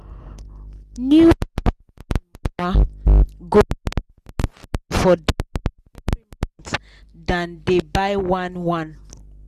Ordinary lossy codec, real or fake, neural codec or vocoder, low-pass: Opus, 64 kbps; real; none; 14.4 kHz